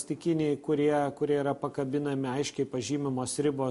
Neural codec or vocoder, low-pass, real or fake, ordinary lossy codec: none; 14.4 kHz; real; MP3, 48 kbps